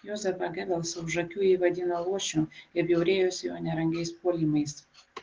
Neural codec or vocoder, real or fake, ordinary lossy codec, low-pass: none; real; Opus, 16 kbps; 7.2 kHz